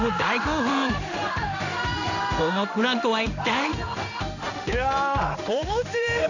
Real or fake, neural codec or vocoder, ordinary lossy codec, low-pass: fake; codec, 16 kHz in and 24 kHz out, 1 kbps, XY-Tokenizer; none; 7.2 kHz